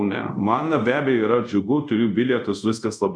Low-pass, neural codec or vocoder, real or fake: 9.9 kHz; codec, 24 kHz, 0.5 kbps, DualCodec; fake